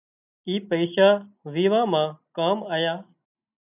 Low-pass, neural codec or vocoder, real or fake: 3.6 kHz; none; real